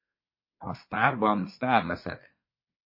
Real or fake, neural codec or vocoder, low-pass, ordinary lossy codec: fake; codec, 24 kHz, 1 kbps, SNAC; 5.4 kHz; MP3, 24 kbps